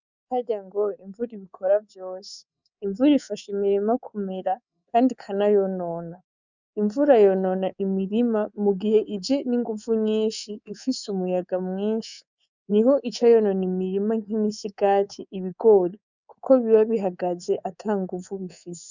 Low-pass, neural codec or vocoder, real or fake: 7.2 kHz; codec, 24 kHz, 3.1 kbps, DualCodec; fake